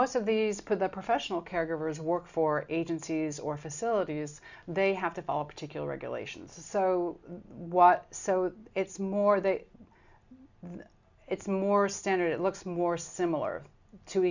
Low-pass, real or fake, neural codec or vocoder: 7.2 kHz; real; none